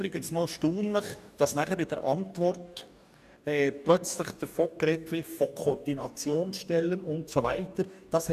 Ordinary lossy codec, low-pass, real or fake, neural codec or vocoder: none; 14.4 kHz; fake; codec, 44.1 kHz, 2.6 kbps, DAC